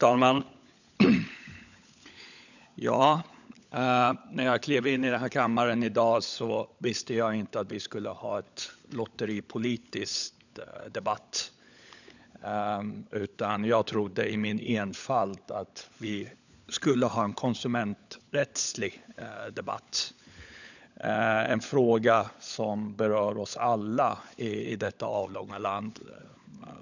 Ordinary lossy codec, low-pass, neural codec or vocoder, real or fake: none; 7.2 kHz; codec, 16 kHz, 16 kbps, FunCodec, trained on LibriTTS, 50 frames a second; fake